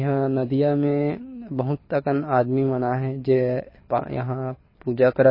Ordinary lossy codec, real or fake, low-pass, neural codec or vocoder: MP3, 24 kbps; fake; 5.4 kHz; codec, 24 kHz, 6 kbps, HILCodec